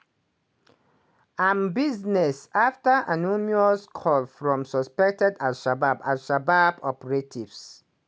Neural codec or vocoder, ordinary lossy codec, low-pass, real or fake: none; none; none; real